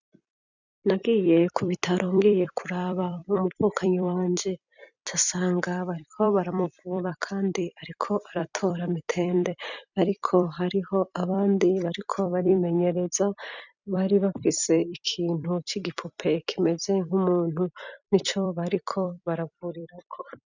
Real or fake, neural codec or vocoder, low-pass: fake; vocoder, 44.1 kHz, 128 mel bands every 256 samples, BigVGAN v2; 7.2 kHz